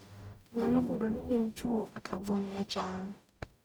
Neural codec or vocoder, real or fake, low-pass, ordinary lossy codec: codec, 44.1 kHz, 0.9 kbps, DAC; fake; none; none